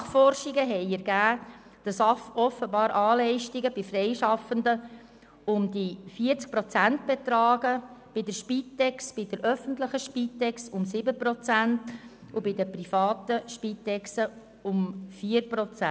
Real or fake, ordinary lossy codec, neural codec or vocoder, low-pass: real; none; none; none